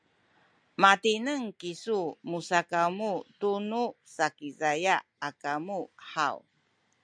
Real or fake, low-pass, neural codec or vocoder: real; 9.9 kHz; none